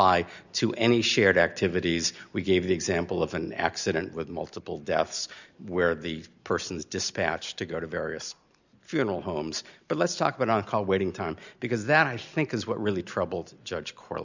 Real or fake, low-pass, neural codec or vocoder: real; 7.2 kHz; none